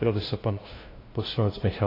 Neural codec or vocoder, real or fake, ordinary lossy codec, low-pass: codec, 16 kHz, 0.5 kbps, FunCodec, trained on LibriTTS, 25 frames a second; fake; AAC, 24 kbps; 5.4 kHz